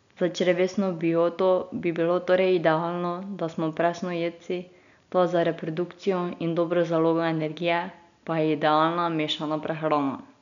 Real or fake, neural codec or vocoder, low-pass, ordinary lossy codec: real; none; 7.2 kHz; none